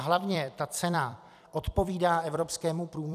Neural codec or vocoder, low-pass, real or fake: vocoder, 44.1 kHz, 128 mel bands every 256 samples, BigVGAN v2; 14.4 kHz; fake